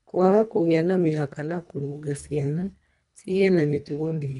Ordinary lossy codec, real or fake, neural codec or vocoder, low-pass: none; fake; codec, 24 kHz, 1.5 kbps, HILCodec; 10.8 kHz